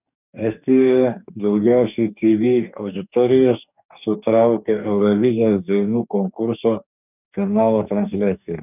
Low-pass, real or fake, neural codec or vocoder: 3.6 kHz; fake; codec, 44.1 kHz, 2.6 kbps, DAC